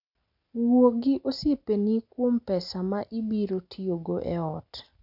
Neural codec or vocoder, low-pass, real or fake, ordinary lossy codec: none; 5.4 kHz; real; none